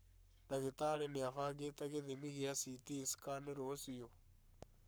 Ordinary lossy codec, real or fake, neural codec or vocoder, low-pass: none; fake; codec, 44.1 kHz, 7.8 kbps, Pupu-Codec; none